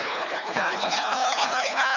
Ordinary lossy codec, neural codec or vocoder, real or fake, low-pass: none; codec, 24 kHz, 3 kbps, HILCodec; fake; 7.2 kHz